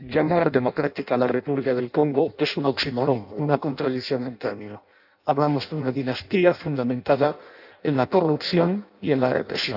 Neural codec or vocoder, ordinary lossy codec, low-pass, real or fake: codec, 16 kHz in and 24 kHz out, 0.6 kbps, FireRedTTS-2 codec; AAC, 48 kbps; 5.4 kHz; fake